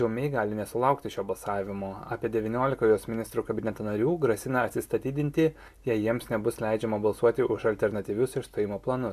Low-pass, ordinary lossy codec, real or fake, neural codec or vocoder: 14.4 kHz; AAC, 96 kbps; real; none